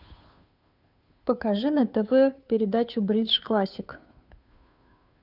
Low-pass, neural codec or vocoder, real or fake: 5.4 kHz; codec, 16 kHz, 2 kbps, FunCodec, trained on Chinese and English, 25 frames a second; fake